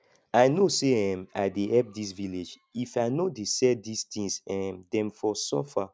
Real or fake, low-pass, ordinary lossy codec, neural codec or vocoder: real; none; none; none